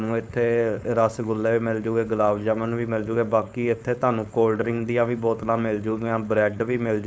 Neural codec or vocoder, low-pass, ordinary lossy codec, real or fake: codec, 16 kHz, 4.8 kbps, FACodec; none; none; fake